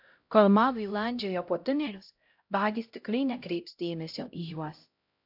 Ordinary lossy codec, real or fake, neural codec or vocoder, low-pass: AAC, 48 kbps; fake; codec, 16 kHz, 0.5 kbps, X-Codec, HuBERT features, trained on LibriSpeech; 5.4 kHz